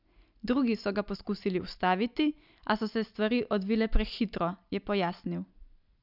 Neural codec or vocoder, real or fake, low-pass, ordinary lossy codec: none; real; 5.4 kHz; none